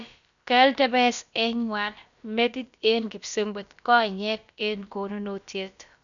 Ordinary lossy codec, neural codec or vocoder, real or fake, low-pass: none; codec, 16 kHz, about 1 kbps, DyCAST, with the encoder's durations; fake; 7.2 kHz